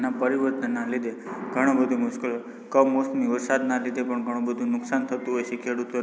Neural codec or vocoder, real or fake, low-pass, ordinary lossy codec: none; real; none; none